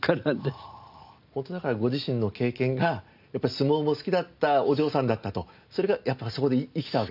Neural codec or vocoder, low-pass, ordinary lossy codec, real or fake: none; 5.4 kHz; none; real